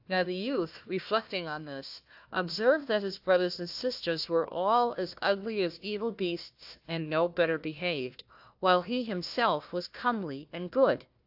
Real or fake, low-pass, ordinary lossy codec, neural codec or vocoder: fake; 5.4 kHz; AAC, 48 kbps; codec, 16 kHz, 1 kbps, FunCodec, trained on Chinese and English, 50 frames a second